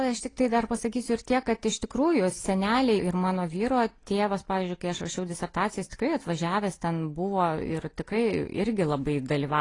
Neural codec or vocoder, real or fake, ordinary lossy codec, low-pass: none; real; AAC, 32 kbps; 10.8 kHz